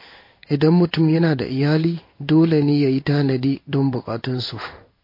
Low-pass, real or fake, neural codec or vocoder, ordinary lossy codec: 5.4 kHz; fake; codec, 16 kHz in and 24 kHz out, 1 kbps, XY-Tokenizer; MP3, 32 kbps